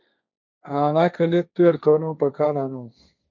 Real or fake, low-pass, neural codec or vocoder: fake; 7.2 kHz; codec, 16 kHz, 1.1 kbps, Voila-Tokenizer